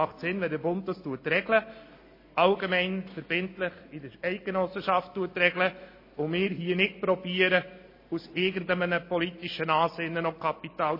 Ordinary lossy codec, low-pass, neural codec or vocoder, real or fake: MP3, 24 kbps; 5.4 kHz; none; real